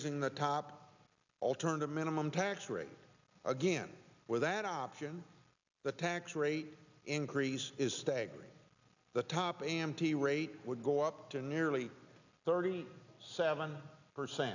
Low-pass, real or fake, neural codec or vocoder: 7.2 kHz; real; none